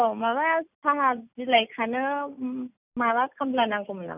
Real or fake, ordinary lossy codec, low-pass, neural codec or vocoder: real; none; 3.6 kHz; none